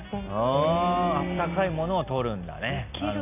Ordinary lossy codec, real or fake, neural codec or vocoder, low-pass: none; real; none; 3.6 kHz